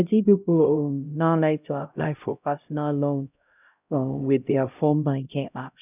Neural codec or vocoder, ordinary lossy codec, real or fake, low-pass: codec, 16 kHz, 0.5 kbps, X-Codec, HuBERT features, trained on LibriSpeech; none; fake; 3.6 kHz